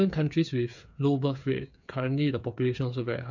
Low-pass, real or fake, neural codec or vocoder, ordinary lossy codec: 7.2 kHz; fake; codec, 16 kHz, 8 kbps, FreqCodec, smaller model; none